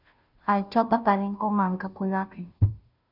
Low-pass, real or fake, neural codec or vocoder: 5.4 kHz; fake; codec, 16 kHz, 0.5 kbps, FunCodec, trained on Chinese and English, 25 frames a second